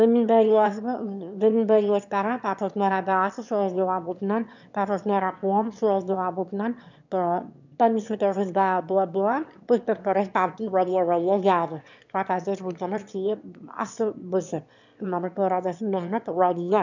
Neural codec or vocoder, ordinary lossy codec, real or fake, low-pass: autoencoder, 22.05 kHz, a latent of 192 numbers a frame, VITS, trained on one speaker; none; fake; 7.2 kHz